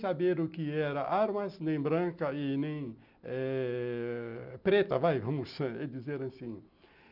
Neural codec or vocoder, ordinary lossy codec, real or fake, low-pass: none; none; real; 5.4 kHz